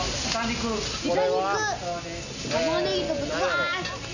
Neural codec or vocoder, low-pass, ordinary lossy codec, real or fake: none; 7.2 kHz; none; real